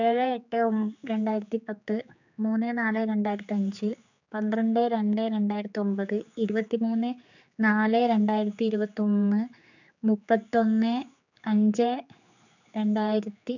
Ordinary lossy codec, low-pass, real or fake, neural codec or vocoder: none; 7.2 kHz; fake; codec, 16 kHz, 4 kbps, X-Codec, HuBERT features, trained on general audio